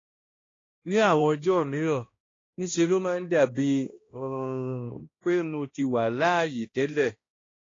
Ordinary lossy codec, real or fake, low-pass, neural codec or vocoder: AAC, 32 kbps; fake; 7.2 kHz; codec, 16 kHz, 1 kbps, X-Codec, HuBERT features, trained on balanced general audio